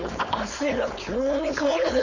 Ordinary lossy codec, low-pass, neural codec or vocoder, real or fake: none; 7.2 kHz; codec, 16 kHz, 4.8 kbps, FACodec; fake